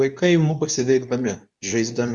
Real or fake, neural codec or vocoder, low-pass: fake; codec, 24 kHz, 0.9 kbps, WavTokenizer, medium speech release version 2; 10.8 kHz